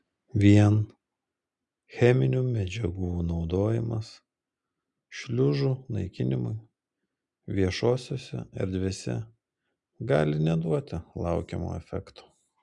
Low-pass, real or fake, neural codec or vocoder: 10.8 kHz; real; none